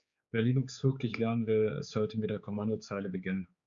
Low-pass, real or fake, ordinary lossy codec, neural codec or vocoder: 7.2 kHz; fake; Opus, 64 kbps; codec, 16 kHz, 4 kbps, X-Codec, HuBERT features, trained on general audio